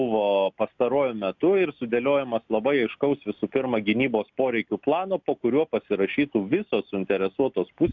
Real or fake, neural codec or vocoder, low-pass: real; none; 7.2 kHz